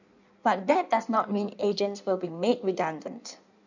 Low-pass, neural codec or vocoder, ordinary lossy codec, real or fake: 7.2 kHz; codec, 16 kHz in and 24 kHz out, 1.1 kbps, FireRedTTS-2 codec; none; fake